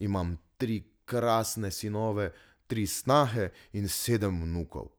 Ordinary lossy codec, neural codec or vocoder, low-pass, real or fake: none; none; none; real